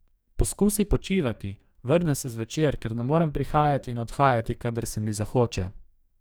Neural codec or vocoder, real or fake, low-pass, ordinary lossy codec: codec, 44.1 kHz, 2.6 kbps, DAC; fake; none; none